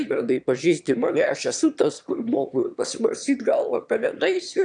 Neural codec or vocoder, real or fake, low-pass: autoencoder, 22.05 kHz, a latent of 192 numbers a frame, VITS, trained on one speaker; fake; 9.9 kHz